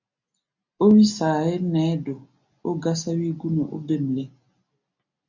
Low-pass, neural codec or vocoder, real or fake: 7.2 kHz; none; real